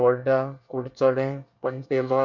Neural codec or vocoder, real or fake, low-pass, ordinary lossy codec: codec, 24 kHz, 1 kbps, SNAC; fake; 7.2 kHz; none